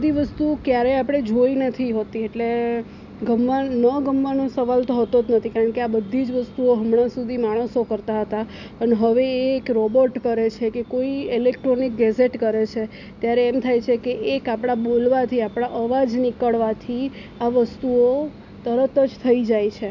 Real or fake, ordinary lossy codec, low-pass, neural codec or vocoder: real; none; 7.2 kHz; none